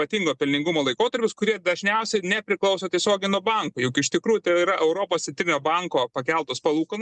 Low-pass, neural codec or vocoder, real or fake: 10.8 kHz; none; real